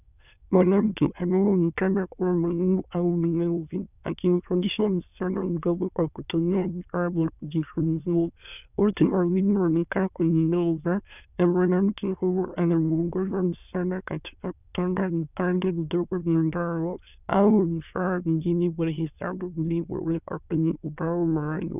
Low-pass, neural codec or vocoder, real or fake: 3.6 kHz; autoencoder, 22.05 kHz, a latent of 192 numbers a frame, VITS, trained on many speakers; fake